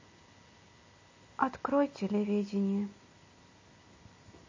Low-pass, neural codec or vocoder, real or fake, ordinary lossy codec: 7.2 kHz; none; real; MP3, 32 kbps